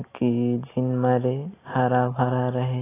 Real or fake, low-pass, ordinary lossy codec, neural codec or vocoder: real; 3.6 kHz; AAC, 16 kbps; none